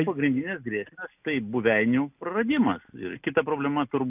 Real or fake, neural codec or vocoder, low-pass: real; none; 3.6 kHz